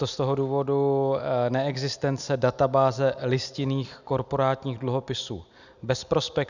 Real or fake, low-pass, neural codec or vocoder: real; 7.2 kHz; none